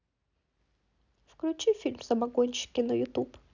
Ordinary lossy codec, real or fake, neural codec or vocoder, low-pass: none; real; none; 7.2 kHz